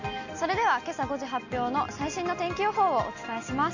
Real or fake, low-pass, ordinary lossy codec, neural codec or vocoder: real; 7.2 kHz; none; none